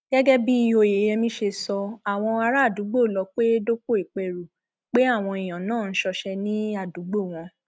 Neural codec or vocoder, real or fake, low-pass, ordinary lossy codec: none; real; none; none